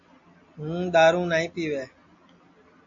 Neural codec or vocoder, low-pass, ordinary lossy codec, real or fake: none; 7.2 kHz; MP3, 48 kbps; real